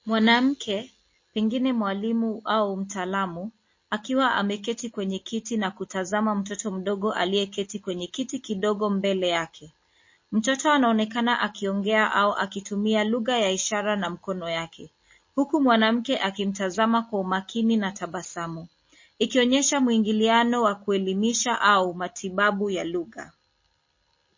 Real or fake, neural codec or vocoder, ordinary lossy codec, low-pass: real; none; MP3, 32 kbps; 7.2 kHz